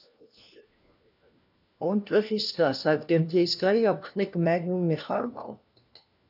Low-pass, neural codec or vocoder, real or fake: 5.4 kHz; codec, 16 kHz, 1 kbps, FunCodec, trained on LibriTTS, 50 frames a second; fake